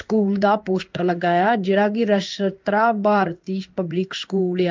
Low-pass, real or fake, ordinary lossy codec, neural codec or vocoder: 7.2 kHz; fake; Opus, 24 kbps; codec, 16 kHz in and 24 kHz out, 1 kbps, XY-Tokenizer